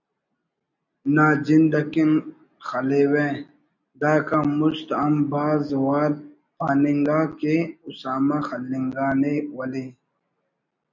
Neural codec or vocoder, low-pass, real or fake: none; 7.2 kHz; real